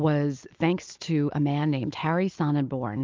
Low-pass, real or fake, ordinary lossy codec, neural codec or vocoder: 7.2 kHz; real; Opus, 32 kbps; none